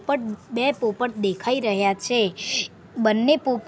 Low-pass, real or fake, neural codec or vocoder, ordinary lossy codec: none; real; none; none